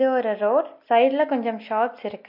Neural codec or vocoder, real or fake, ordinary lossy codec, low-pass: none; real; MP3, 32 kbps; 5.4 kHz